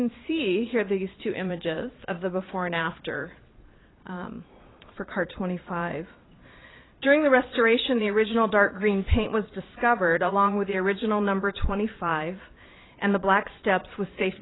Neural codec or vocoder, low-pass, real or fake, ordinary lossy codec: vocoder, 22.05 kHz, 80 mel bands, Vocos; 7.2 kHz; fake; AAC, 16 kbps